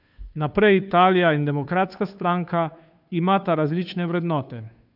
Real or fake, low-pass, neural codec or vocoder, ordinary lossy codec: fake; 5.4 kHz; codec, 16 kHz, 2 kbps, FunCodec, trained on Chinese and English, 25 frames a second; none